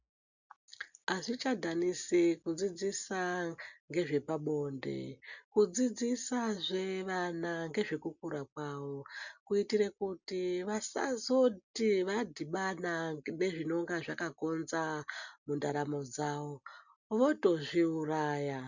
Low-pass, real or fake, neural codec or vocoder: 7.2 kHz; real; none